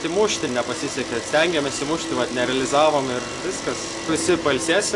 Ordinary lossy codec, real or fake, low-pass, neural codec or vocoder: AAC, 48 kbps; real; 10.8 kHz; none